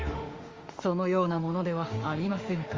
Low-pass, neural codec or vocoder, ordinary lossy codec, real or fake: 7.2 kHz; autoencoder, 48 kHz, 32 numbers a frame, DAC-VAE, trained on Japanese speech; Opus, 32 kbps; fake